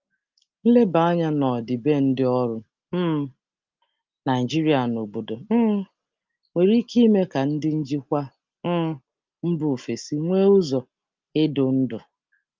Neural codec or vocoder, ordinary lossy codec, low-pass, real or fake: none; Opus, 24 kbps; 7.2 kHz; real